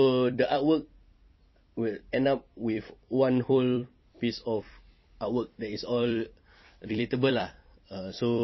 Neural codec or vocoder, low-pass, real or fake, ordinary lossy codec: vocoder, 44.1 kHz, 128 mel bands every 256 samples, BigVGAN v2; 7.2 kHz; fake; MP3, 24 kbps